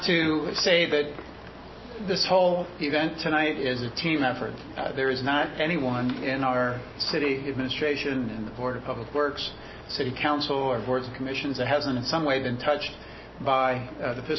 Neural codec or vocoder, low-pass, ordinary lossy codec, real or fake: none; 7.2 kHz; MP3, 24 kbps; real